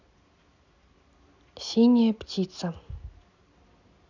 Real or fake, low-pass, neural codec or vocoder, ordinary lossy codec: real; 7.2 kHz; none; none